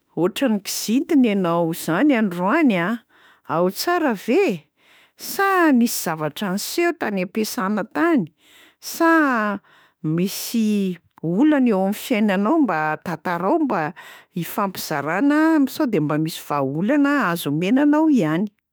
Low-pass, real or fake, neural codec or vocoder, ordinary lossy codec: none; fake; autoencoder, 48 kHz, 32 numbers a frame, DAC-VAE, trained on Japanese speech; none